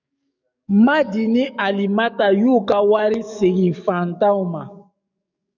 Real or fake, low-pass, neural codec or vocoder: fake; 7.2 kHz; codec, 44.1 kHz, 7.8 kbps, DAC